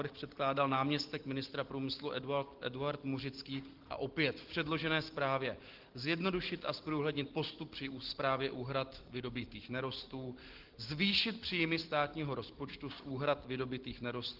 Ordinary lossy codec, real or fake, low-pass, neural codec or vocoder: Opus, 16 kbps; real; 5.4 kHz; none